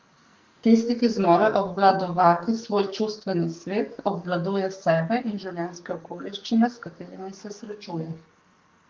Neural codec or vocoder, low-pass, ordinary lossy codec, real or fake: codec, 44.1 kHz, 2.6 kbps, SNAC; 7.2 kHz; Opus, 32 kbps; fake